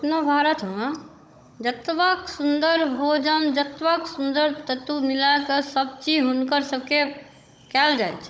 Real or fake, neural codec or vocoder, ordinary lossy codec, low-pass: fake; codec, 16 kHz, 16 kbps, FunCodec, trained on Chinese and English, 50 frames a second; none; none